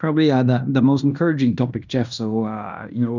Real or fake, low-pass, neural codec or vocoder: fake; 7.2 kHz; codec, 16 kHz in and 24 kHz out, 0.9 kbps, LongCat-Audio-Codec, fine tuned four codebook decoder